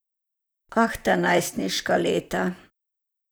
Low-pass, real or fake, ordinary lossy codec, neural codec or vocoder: none; fake; none; vocoder, 44.1 kHz, 128 mel bands, Pupu-Vocoder